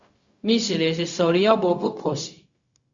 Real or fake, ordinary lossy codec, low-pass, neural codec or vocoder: fake; Opus, 64 kbps; 7.2 kHz; codec, 16 kHz, 0.4 kbps, LongCat-Audio-Codec